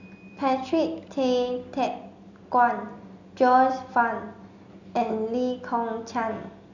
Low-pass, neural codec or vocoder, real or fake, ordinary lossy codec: 7.2 kHz; none; real; none